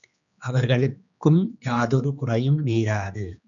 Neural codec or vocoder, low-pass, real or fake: codec, 16 kHz, 2 kbps, X-Codec, HuBERT features, trained on general audio; 7.2 kHz; fake